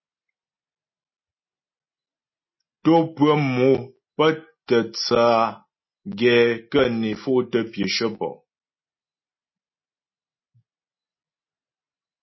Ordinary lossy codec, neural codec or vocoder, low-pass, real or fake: MP3, 24 kbps; none; 7.2 kHz; real